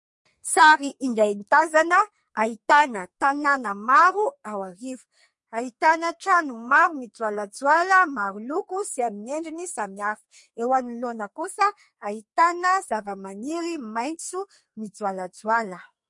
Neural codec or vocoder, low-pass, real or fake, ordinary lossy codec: codec, 44.1 kHz, 2.6 kbps, SNAC; 10.8 kHz; fake; MP3, 48 kbps